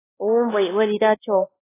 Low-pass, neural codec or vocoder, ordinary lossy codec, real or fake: 3.6 kHz; codec, 16 kHz, 2 kbps, X-Codec, WavLM features, trained on Multilingual LibriSpeech; AAC, 16 kbps; fake